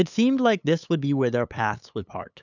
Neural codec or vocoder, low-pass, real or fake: codec, 16 kHz, 8 kbps, FunCodec, trained on LibriTTS, 25 frames a second; 7.2 kHz; fake